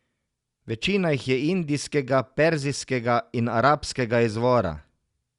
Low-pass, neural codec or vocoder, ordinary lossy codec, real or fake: 10.8 kHz; none; Opus, 64 kbps; real